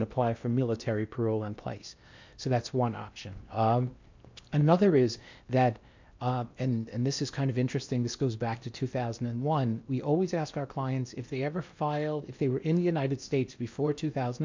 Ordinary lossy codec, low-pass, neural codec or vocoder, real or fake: MP3, 64 kbps; 7.2 kHz; codec, 16 kHz in and 24 kHz out, 0.8 kbps, FocalCodec, streaming, 65536 codes; fake